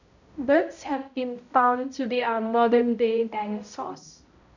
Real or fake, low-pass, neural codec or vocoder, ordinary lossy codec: fake; 7.2 kHz; codec, 16 kHz, 0.5 kbps, X-Codec, HuBERT features, trained on balanced general audio; none